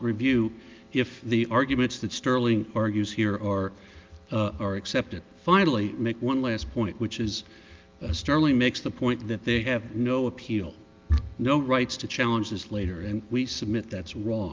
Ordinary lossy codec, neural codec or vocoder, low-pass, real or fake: Opus, 24 kbps; none; 7.2 kHz; real